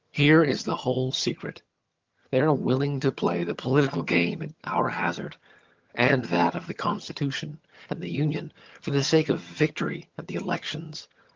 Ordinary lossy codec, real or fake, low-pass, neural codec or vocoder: Opus, 32 kbps; fake; 7.2 kHz; vocoder, 22.05 kHz, 80 mel bands, HiFi-GAN